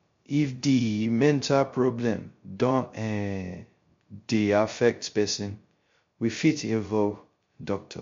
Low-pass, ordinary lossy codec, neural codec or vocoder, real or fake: 7.2 kHz; MP3, 48 kbps; codec, 16 kHz, 0.2 kbps, FocalCodec; fake